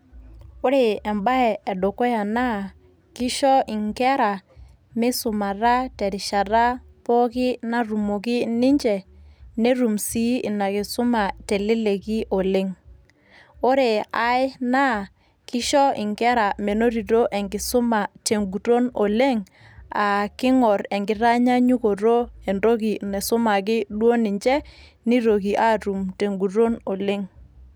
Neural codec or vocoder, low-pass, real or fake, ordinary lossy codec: none; none; real; none